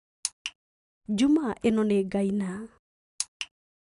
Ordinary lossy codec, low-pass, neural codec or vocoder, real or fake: none; 10.8 kHz; none; real